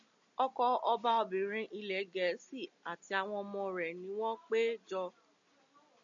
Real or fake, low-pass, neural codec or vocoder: real; 7.2 kHz; none